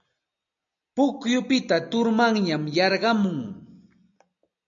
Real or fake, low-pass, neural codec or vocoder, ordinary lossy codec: real; 7.2 kHz; none; MP3, 64 kbps